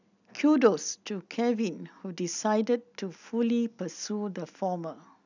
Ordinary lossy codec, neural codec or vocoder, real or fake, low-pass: none; none; real; 7.2 kHz